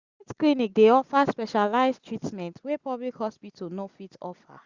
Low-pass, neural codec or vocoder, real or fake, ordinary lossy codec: 7.2 kHz; none; real; Opus, 64 kbps